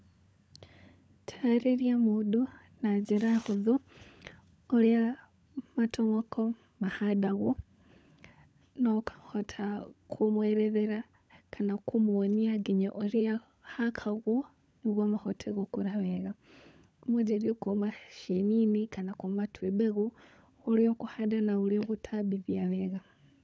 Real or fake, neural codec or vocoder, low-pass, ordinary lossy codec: fake; codec, 16 kHz, 16 kbps, FunCodec, trained on LibriTTS, 50 frames a second; none; none